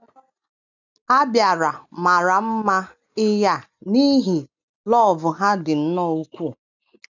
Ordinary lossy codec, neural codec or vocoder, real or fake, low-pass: none; none; real; 7.2 kHz